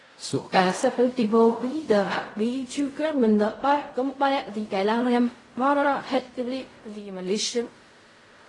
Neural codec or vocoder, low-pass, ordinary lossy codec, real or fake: codec, 16 kHz in and 24 kHz out, 0.4 kbps, LongCat-Audio-Codec, fine tuned four codebook decoder; 10.8 kHz; AAC, 32 kbps; fake